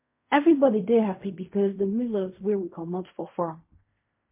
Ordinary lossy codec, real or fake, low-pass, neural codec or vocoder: MP3, 24 kbps; fake; 3.6 kHz; codec, 16 kHz in and 24 kHz out, 0.4 kbps, LongCat-Audio-Codec, fine tuned four codebook decoder